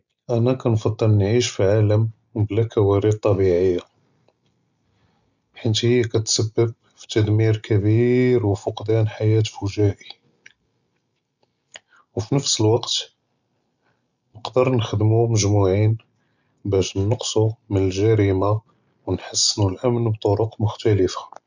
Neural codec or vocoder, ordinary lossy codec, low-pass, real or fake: none; none; 7.2 kHz; real